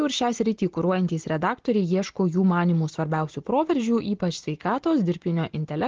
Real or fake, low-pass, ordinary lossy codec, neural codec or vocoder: real; 7.2 kHz; Opus, 16 kbps; none